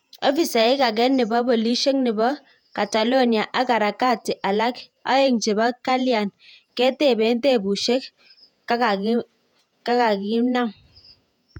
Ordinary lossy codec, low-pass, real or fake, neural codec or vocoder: none; 19.8 kHz; fake; vocoder, 48 kHz, 128 mel bands, Vocos